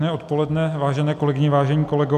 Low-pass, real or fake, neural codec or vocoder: 14.4 kHz; real; none